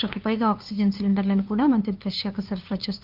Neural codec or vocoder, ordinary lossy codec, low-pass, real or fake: codec, 16 kHz, 2 kbps, FunCodec, trained on LibriTTS, 25 frames a second; Opus, 32 kbps; 5.4 kHz; fake